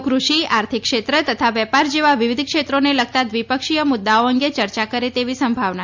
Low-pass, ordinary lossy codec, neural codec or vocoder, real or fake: 7.2 kHz; MP3, 64 kbps; none; real